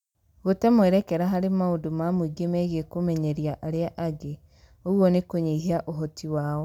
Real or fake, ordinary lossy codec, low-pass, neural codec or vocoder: real; none; 19.8 kHz; none